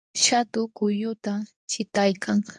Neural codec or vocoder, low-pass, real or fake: codec, 24 kHz, 0.9 kbps, WavTokenizer, medium speech release version 2; 10.8 kHz; fake